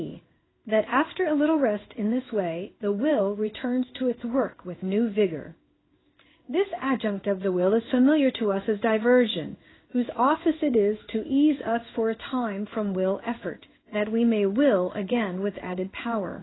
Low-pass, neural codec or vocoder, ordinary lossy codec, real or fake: 7.2 kHz; codec, 16 kHz in and 24 kHz out, 1 kbps, XY-Tokenizer; AAC, 16 kbps; fake